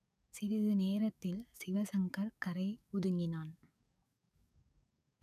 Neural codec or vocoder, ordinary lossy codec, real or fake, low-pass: codec, 44.1 kHz, 7.8 kbps, DAC; none; fake; 14.4 kHz